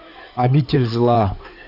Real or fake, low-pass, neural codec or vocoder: fake; 5.4 kHz; codec, 16 kHz in and 24 kHz out, 2.2 kbps, FireRedTTS-2 codec